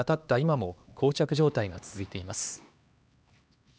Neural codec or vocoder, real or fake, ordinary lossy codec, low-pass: codec, 16 kHz, 2 kbps, X-Codec, HuBERT features, trained on LibriSpeech; fake; none; none